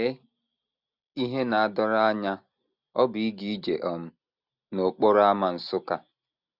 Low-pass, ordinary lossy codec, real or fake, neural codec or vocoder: 5.4 kHz; none; real; none